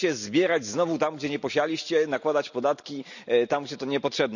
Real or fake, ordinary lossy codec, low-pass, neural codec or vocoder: real; none; 7.2 kHz; none